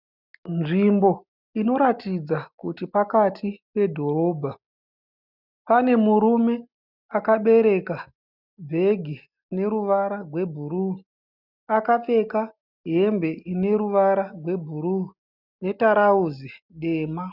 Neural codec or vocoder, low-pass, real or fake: none; 5.4 kHz; real